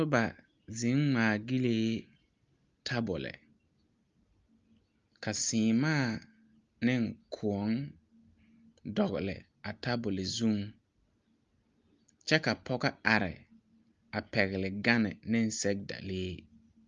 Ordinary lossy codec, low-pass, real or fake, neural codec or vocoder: Opus, 32 kbps; 7.2 kHz; real; none